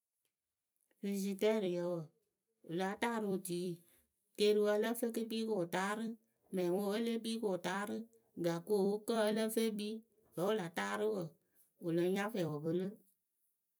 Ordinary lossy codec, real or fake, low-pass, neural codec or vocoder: none; fake; none; vocoder, 44.1 kHz, 128 mel bands every 256 samples, BigVGAN v2